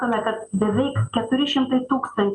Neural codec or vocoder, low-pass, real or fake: none; 10.8 kHz; real